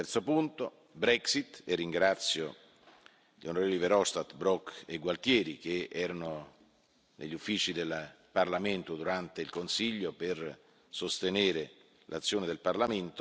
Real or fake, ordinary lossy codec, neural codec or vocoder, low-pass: real; none; none; none